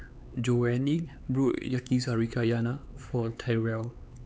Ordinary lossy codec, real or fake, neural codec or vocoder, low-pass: none; fake; codec, 16 kHz, 4 kbps, X-Codec, HuBERT features, trained on LibriSpeech; none